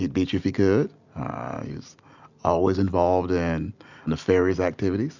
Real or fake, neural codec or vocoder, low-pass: real; none; 7.2 kHz